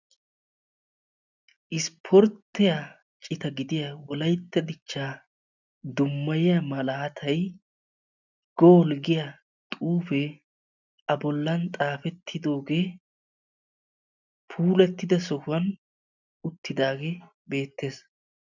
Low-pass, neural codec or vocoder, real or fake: 7.2 kHz; none; real